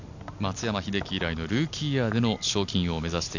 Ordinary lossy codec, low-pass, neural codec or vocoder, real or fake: none; 7.2 kHz; none; real